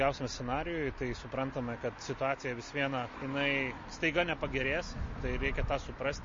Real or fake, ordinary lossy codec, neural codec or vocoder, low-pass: real; MP3, 32 kbps; none; 7.2 kHz